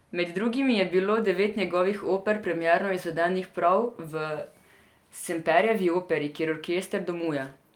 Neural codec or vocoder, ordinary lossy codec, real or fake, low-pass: none; Opus, 32 kbps; real; 19.8 kHz